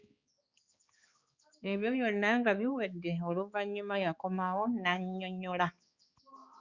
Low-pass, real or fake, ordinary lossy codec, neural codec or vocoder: 7.2 kHz; fake; Opus, 64 kbps; codec, 16 kHz, 4 kbps, X-Codec, HuBERT features, trained on balanced general audio